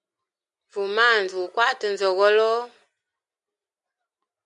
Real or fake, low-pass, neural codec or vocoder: real; 10.8 kHz; none